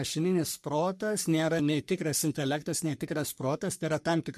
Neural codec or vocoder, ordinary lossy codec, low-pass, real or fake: codec, 44.1 kHz, 3.4 kbps, Pupu-Codec; MP3, 64 kbps; 14.4 kHz; fake